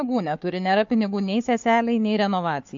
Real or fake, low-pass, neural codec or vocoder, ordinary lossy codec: fake; 7.2 kHz; codec, 16 kHz, 4 kbps, FunCodec, trained on Chinese and English, 50 frames a second; MP3, 48 kbps